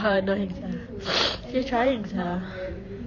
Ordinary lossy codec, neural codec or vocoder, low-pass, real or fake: none; vocoder, 44.1 kHz, 128 mel bands every 512 samples, BigVGAN v2; 7.2 kHz; fake